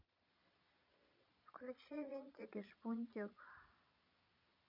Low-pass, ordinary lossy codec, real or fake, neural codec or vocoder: 5.4 kHz; none; fake; vocoder, 22.05 kHz, 80 mel bands, Vocos